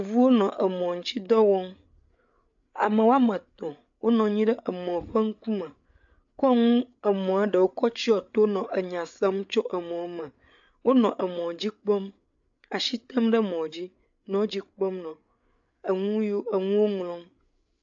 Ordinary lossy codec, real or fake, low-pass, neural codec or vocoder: AAC, 64 kbps; fake; 7.2 kHz; codec, 16 kHz, 8 kbps, FreqCodec, larger model